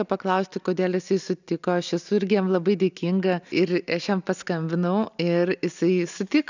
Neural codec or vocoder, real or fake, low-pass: none; real; 7.2 kHz